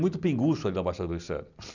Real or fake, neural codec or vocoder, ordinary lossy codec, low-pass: real; none; none; 7.2 kHz